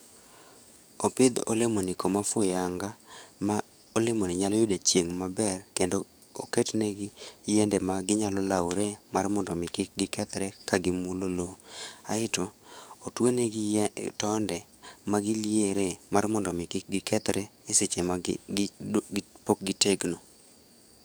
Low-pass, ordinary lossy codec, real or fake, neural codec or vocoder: none; none; fake; codec, 44.1 kHz, 7.8 kbps, DAC